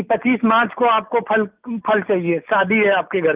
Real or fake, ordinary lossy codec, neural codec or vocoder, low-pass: real; Opus, 32 kbps; none; 3.6 kHz